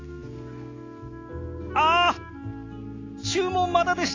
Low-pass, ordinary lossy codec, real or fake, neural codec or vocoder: 7.2 kHz; AAC, 32 kbps; real; none